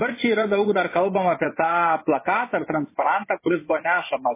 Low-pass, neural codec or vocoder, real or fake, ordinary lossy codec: 3.6 kHz; vocoder, 24 kHz, 100 mel bands, Vocos; fake; MP3, 16 kbps